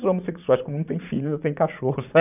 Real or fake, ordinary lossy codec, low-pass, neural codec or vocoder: fake; none; 3.6 kHz; vocoder, 44.1 kHz, 80 mel bands, Vocos